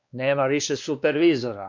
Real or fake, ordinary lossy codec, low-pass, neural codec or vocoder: fake; MP3, 64 kbps; 7.2 kHz; codec, 16 kHz, 2 kbps, X-Codec, WavLM features, trained on Multilingual LibriSpeech